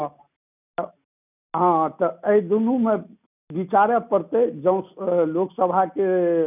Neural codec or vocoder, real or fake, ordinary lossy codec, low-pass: none; real; none; 3.6 kHz